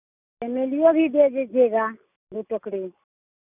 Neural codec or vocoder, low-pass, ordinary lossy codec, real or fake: none; 3.6 kHz; none; real